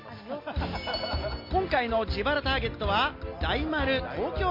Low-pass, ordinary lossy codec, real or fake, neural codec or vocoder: 5.4 kHz; MP3, 32 kbps; real; none